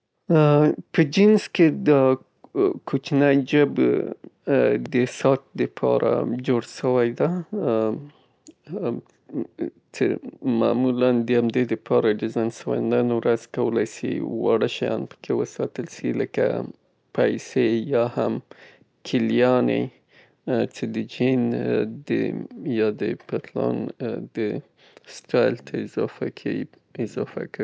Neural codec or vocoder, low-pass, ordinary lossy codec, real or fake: none; none; none; real